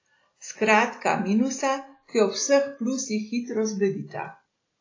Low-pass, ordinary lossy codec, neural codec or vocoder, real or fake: 7.2 kHz; AAC, 32 kbps; none; real